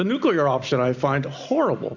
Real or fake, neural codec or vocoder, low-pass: real; none; 7.2 kHz